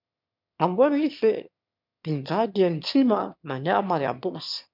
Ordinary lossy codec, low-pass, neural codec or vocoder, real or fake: MP3, 48 kbps; 5.4 kHz; autoencoder, 22.05 kHz, a latent of 192 numbers a frame, VITS, trained on one speaker; fake